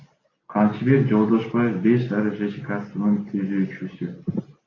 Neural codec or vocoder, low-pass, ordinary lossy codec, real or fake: none; 7.2 kHz; AAC, 32 kbps; real